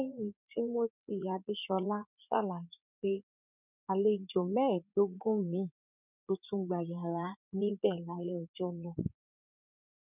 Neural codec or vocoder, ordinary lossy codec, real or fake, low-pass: vocoder, 44.1 kHz, 80 mel bands, Vocos; none; fake; 3.6 kHz